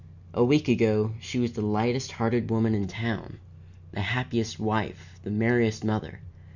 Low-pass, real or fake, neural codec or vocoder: 7.2 kHz; real; none